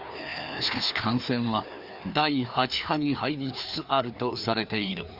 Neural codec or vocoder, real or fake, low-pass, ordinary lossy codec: codec, 16 kHz, 2 kbps, FreqCodec, larger model; fake; 5.4 kHz; Opus, 64 kbps